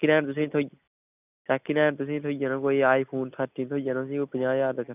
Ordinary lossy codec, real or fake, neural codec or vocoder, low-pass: none; real; none; 3.6 kHz